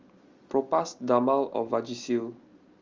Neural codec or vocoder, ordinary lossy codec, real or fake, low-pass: none; Opus, 32 kbps; real; 7.2 kHz